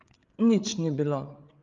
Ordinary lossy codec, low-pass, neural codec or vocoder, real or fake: Opus, 32 kbps; 7.2 kHz; codec, 16 kHz, 8 kbps, FreqCodec, larger model; fake